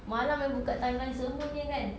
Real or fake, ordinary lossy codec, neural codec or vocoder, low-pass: real; none; none; none